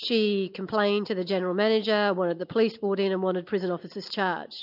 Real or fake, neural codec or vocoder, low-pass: real; none; 5.4 kHz